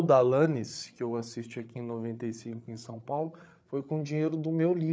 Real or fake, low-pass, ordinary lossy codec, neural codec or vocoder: fake; none; none; codec, 16 kHz, 8 kbps, FreqCodec, larger model